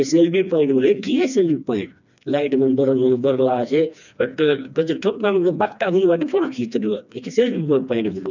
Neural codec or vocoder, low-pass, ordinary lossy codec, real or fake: codec, 16 kHz, 2 kbps, FreqCodec, smaller model; 7.2 kHz; none; fake